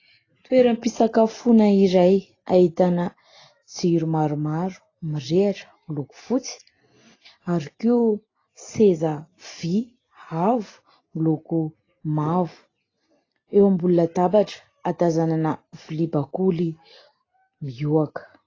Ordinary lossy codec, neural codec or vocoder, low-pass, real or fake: AAC, 32 kbps; none; 7.2 kHz; real